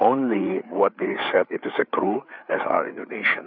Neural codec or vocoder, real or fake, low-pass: codec, 16 kHz, 4 kbps, FreqCodec, larger model; fake; 5.4 kHz